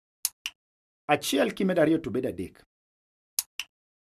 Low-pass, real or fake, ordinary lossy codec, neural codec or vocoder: 14.4 kHz; real; none; none